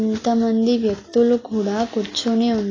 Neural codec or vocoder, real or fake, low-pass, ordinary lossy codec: none; real; 7.2 kHz; AAC, 32 kbps